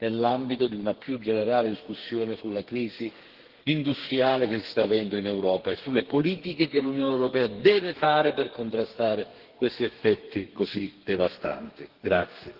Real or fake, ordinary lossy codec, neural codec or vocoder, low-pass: fake; Opus, 16 kbps; codec, 32 kHz, 1.9 kbps, SNAC; 5.4 kHz